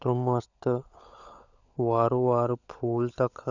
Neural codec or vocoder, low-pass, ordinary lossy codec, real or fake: codec, 16 kHz, 16 kbps, FunCodec, trained on LibriTTS, 50 frames a second; 7.2 kHz; none; fake